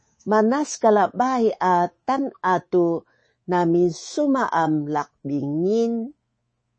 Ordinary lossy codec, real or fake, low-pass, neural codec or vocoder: MP3, 32 kbps; fake; 9.9 kHz; codec, 24 kHz, 3.1 kbps, DualCodec